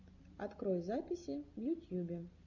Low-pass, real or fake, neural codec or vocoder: 7.2 kHz; real; none